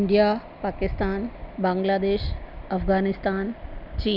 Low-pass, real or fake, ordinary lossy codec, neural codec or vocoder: 5.4 kHz; real; none; none